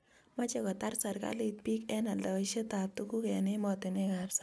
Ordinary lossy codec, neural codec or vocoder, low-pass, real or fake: none; none; none; real